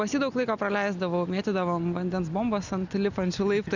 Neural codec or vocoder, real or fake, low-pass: none; real; 7.2 kHz